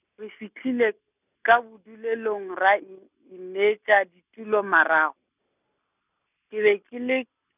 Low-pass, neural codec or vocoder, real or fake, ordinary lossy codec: 3.6 kHz; none; real; none